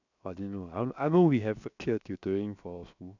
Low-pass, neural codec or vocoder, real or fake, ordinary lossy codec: 7.2 kHz; codec, 16 kHz, 0.7 kbps, FocalCodec; fake; none